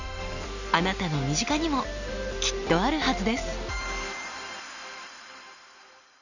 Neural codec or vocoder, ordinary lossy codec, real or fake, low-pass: none; none; real; 7.2 kHz